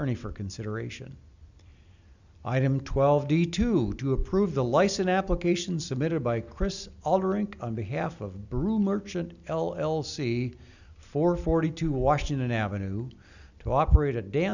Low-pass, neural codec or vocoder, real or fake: 7.2 kHz; none; real